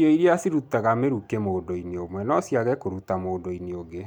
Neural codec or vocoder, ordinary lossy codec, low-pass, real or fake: none; none; 19.8 kHz; real